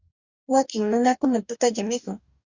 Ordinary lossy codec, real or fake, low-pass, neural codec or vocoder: Opus, 64 kbps; fake; 7.2 kHz; codec, 44.1 kHz, 2.6 kbps, DAC